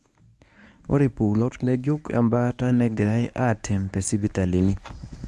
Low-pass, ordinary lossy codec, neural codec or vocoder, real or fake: none; none; codec, 24 kHz, 0.9 kbps, WavTokenizer, medium speech release version 1; fake